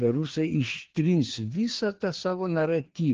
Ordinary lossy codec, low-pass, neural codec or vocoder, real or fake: Opus, 16 kbps; 7.2 kHz; codec, 16 kHz, 2 kbps, FreqCodec, larger model; fake